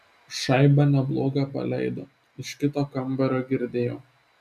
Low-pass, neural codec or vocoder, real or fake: 14.4 kHz; none; real